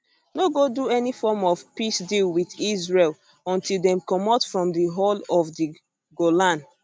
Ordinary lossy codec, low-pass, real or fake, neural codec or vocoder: none; none; real; none